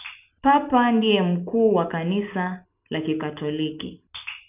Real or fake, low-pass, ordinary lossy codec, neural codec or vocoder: real; 3.6 kHz; none; none